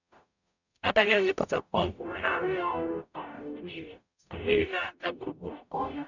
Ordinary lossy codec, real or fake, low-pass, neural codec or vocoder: none; fake; 7.2 kHz; codec, 44.1 kHz, 0.9 kbps, DAC